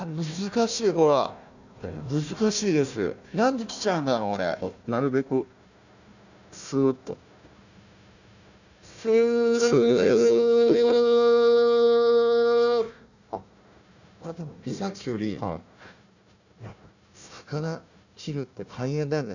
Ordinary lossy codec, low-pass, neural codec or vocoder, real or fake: none; 7.2 kHz; codec, 16 kHz, 1 kbps, FunCodec, trained on Chinese and English, 50 frames a second; fake